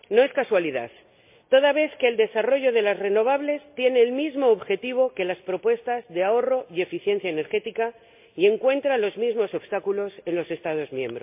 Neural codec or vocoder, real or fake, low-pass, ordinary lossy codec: none; real; 3.6 kHz; MP3, 32 kbps